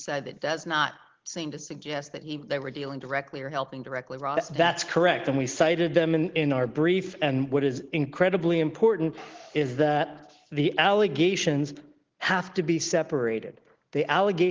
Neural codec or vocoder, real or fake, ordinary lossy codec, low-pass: none; real; Opus, 16 kbps; 7.2 kHz